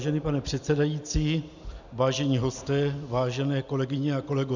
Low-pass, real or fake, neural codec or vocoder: 7.2 kHz; real; none